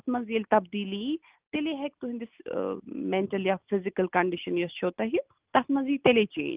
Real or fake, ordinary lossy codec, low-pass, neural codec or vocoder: real; Opus, 32 kbps; 3.6 kHz; none